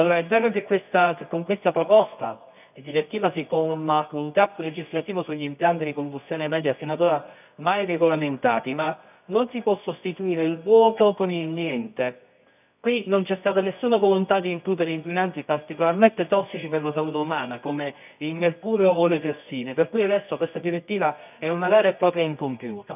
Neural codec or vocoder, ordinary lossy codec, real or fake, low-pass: codec, 24 kHz, 0.9 kbps, WavTokenizer, medium music audio release; none; fake; 3.6 kHz